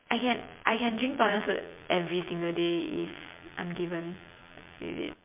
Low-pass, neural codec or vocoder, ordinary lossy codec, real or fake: 3.6 kHz; vocoder, 22.05 kHz, 80 mel bands, Vocos; MP3, 32 kbps; fake